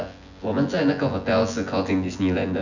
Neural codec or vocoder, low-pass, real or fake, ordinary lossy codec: vocoder, 24 kHz, 100 mel bands, Vocos; 7.2 kHz; fake; none